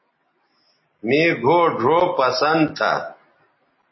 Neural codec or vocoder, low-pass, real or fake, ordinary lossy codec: none; 7.2 kHz; real; MP3, 24 kbps